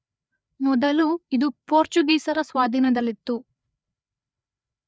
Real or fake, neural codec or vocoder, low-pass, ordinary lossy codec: fake; codec, 16 kHz, 4 kbps, FreqCodec, larger model; none; none